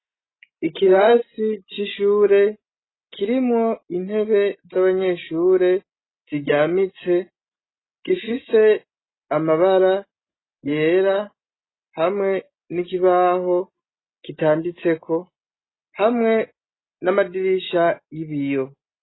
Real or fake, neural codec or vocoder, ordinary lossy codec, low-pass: real; none; AAC, 16 kbps; 7.2 kHz